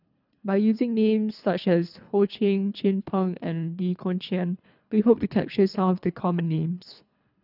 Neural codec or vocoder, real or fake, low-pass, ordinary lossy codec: codec, 24 kHz, 3 kbps, HILCodec; fake; 5.4 kHz; none